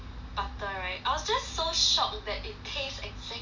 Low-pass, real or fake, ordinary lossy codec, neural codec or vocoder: 7.2 kHz; real; none; none